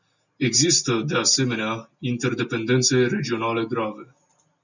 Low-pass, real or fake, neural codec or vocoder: 7.2 kHz; real; none